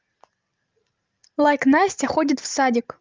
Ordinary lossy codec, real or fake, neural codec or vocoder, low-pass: Opus, 24 kbps; real; none; 7.2 kHz